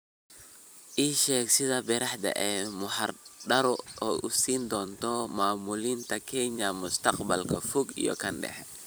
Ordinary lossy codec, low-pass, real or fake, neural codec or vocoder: none; none; fake; vocoder, 44.1 kHz, 128 mel bands every 256 samples, BigVGAN v2